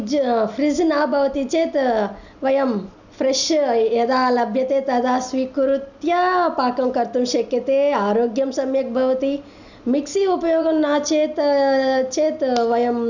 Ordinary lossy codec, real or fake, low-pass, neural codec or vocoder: none; real; 7.2 kHz; none